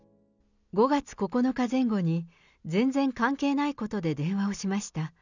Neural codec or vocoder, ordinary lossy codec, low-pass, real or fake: none; none; 7.2 kHz; real